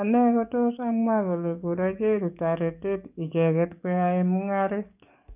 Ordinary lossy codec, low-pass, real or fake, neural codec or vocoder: none; 3.6 kHz; fake; codec, 44.1 kHz, 7.8 kbps, DAC